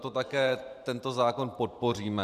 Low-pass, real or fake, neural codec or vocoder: 14.4 kHz; fake; vocoder, 44.1 kHz, 128 mel bands every 512 samples, BigVGAN v2